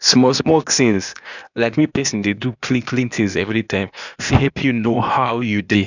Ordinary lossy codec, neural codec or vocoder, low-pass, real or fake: none; codec, 16 kHz, 0.8 kbps, ZipCodec; 7.2 kHz; fake